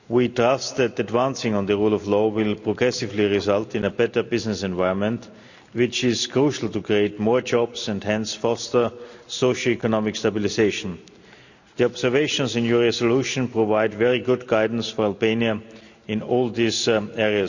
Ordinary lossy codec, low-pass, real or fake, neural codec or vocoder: none; 7.2 kHz; real; none